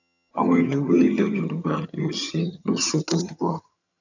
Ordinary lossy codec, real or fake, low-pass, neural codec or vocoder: none; fake; 7.2 kHz; vocoder, 22.05 kHz, 80 mel bands, HiFi-GAN